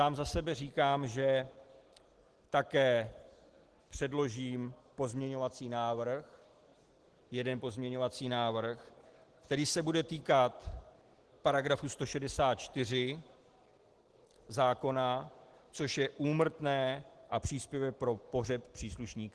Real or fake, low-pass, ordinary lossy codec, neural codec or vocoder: real; 10.8 kHz; Opus, 16 kbps; none